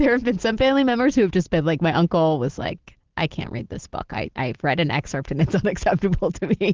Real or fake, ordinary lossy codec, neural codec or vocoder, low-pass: real; Opus, 16 kbps; none; 7.2 kHz